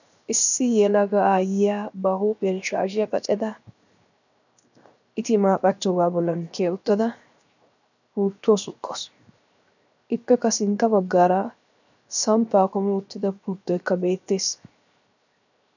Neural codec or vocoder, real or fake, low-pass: codec, 16 kHz, 0.7 kbps, FocalCodec; fake; 7.2 kHz